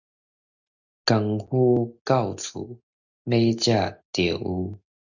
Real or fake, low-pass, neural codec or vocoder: real; 7.2 kHz; none